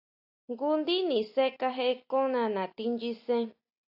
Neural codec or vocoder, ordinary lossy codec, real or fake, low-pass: none; AAC, 48 kbps; real; 5.4 kHz